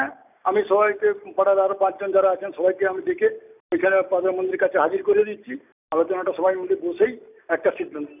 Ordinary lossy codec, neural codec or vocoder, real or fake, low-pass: none; none; real; 3.6 kHz